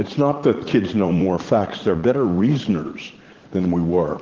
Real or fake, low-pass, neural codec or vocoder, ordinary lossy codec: fake; 7.2 kHz; vocoder, 44.1 kHz, 80 mel bands, Vocos; Opus, 16 kbps